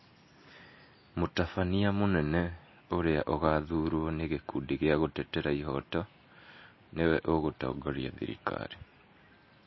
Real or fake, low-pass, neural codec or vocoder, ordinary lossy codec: real; 7.2 kHz; none; MP3, 24 kbps